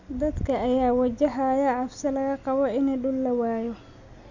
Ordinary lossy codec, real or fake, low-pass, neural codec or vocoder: none; real; 7.2 kHz; none